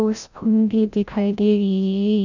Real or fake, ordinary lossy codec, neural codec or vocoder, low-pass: fake; none; codec, 16 kHz, 0.5 kbps, FreqCodec, larger model; 7.2 kHz